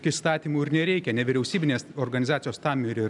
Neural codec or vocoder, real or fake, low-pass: none; real; 10.8 kHz